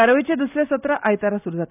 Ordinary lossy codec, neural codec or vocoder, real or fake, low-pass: none; none; real; 3.6 kHz